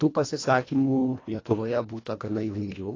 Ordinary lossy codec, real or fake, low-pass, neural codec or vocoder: AAC, 32 kbps; fake; 7.2 kHz; codec, 24 kHz, 1.5 kbps, HILCodec